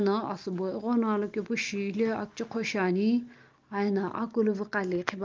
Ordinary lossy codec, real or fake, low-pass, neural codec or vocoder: Opus, 32 kbps; real; 7.2 kHz; none